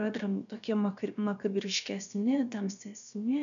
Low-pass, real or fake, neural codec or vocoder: 7.2 kHz; fake; codec, 16 kHz, about 1 kbps, DyCAST, with the encoder's durations